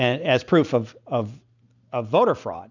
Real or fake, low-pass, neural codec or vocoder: real; 7.2 kHz; none